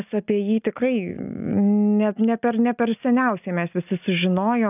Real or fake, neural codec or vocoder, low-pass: real; none; 3.6 kHz